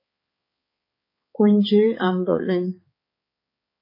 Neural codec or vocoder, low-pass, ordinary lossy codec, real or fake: codec, 16 kHz, 4 kbps, X-Codec, HuBERT features, trained on balanced general audio; 5.4 kHz; MP3, 24 kbps; fake